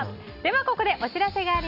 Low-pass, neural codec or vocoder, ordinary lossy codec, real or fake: 5.4 kHz; none; none; real